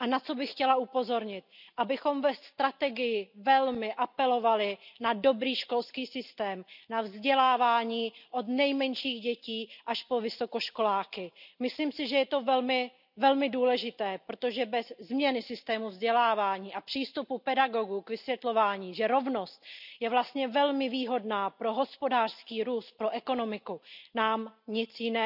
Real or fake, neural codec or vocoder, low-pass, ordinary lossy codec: real; none; 5.4 kHz; none